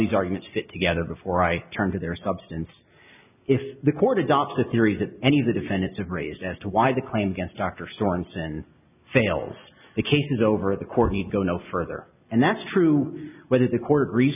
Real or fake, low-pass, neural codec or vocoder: real; 3.6 kHz; none